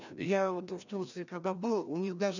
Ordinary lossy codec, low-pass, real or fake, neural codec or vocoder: none; 7.2 kHz; fake; codec, 16 kHz, 1 kbps, FreqCodec, larger model